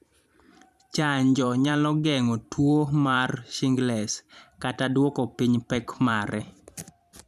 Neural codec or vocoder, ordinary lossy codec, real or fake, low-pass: vocoder, 44.1 kHz, 128 mel bands every 512 samples, BigVGAN v2; none; fake; 14.4 kHz